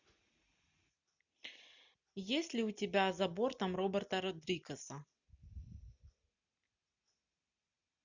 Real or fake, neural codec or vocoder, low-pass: real; none; 7.2 kHz